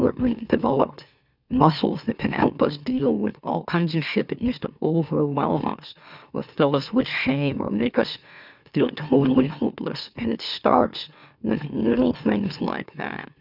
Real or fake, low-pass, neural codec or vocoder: fake; 5.4 kHz; autoencoder, 44.1 kHz, a latent of 192 numbers a frame, MeloTTS